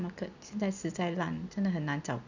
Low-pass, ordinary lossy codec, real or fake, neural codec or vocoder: 7.2 kHz; none; real; none